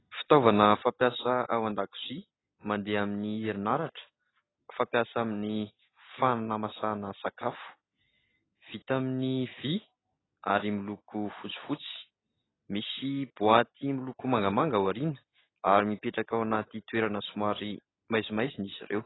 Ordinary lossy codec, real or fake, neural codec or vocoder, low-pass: AAC, 16 kbps; real; none; 7.2 kHz